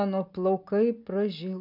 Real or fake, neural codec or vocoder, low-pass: real; none; 5.4 kHz